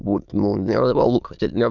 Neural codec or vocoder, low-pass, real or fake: autoencoder, 22.05 kHz, a latent of 192 numbers a frame, VITS, trained on many speakers; 7.2 kHz; fake